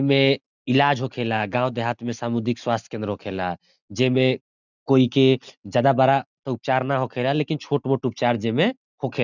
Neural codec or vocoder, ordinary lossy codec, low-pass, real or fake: none; none; 7.2 kHz; real